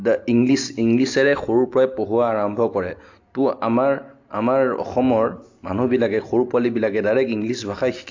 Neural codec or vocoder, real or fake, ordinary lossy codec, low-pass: none; real; AAC, 48 kbps; 7.2 kHz